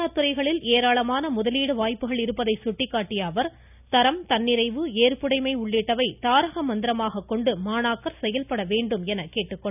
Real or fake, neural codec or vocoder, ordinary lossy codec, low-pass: real; none; none; 3.6 kHz